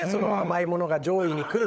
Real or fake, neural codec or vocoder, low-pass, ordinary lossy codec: fake; codec, 16 kHz, 16 kbps, FunCodec, trained on LibriTTS, 50 frames a second; none; none